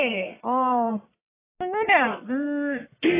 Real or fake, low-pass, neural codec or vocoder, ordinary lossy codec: fake; 3.6 kHz; codec, 44.1 kHz, 1.7 kbps, Pupu-Codec; AAC, 16 kbps